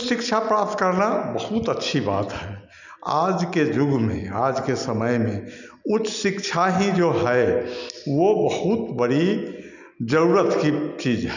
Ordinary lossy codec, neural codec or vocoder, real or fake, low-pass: none; none; real; 7.2 kHz